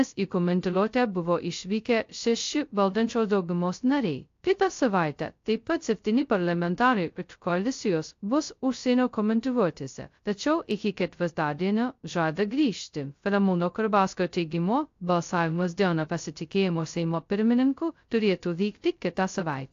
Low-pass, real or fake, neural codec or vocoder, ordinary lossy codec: 7.2 kHz; fake; codec, 16 kHz, 0.2 kbps, FocalCodec; AAC, 48 kbps